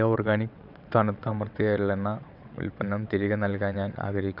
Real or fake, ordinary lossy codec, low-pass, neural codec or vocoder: fake; none; 5.4 kHz; vocoder, 22.05 kHz, 80 mel bands, WaveNeXt